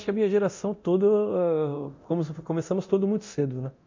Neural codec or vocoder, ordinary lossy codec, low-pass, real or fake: codec, 24 kHz, 0.9 kbps, DualCodec; MP3, 64 kbps; 7.2 kHz; fake